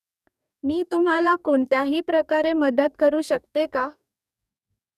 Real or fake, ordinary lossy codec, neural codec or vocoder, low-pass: fake; none; codec, 44.1 kHz, 2.6 kbps, DAC; 14.4 kHz